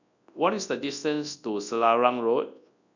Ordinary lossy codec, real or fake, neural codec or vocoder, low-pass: none; fake; codec, 24 kHz, 0.9 kbps, WavTokenizer, large speech release; 7.2 kHz